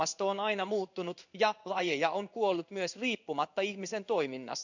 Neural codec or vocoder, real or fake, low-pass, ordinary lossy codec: codec, 16 kHz in and 24 kHz out, 1 kbps, XY-Tokenizer; fake; 7.2 kHz; none